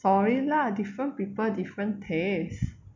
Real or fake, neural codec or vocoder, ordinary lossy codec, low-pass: real; none; MP3, 64 kbps; 7.2 kHz